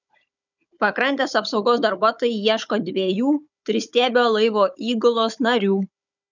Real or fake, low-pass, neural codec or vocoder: fake; 7.2 kHz; codec, 16 kHz, 16 kbps, FunCodec, trained on Chinese and English, 50 frames a second